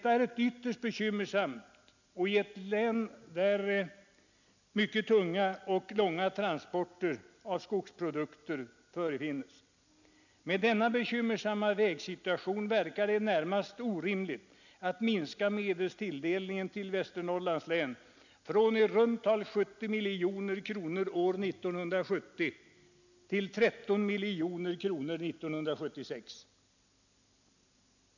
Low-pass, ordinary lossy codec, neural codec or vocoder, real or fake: 7.2 kHz; none; none; real